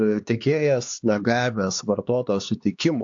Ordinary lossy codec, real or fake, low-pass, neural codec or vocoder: AAC, 64 kbps; fake; 7.2 kHz; codec, 16 kHz, 2 kbps, X-Codec, HuBERT features, trained on LibriSpeech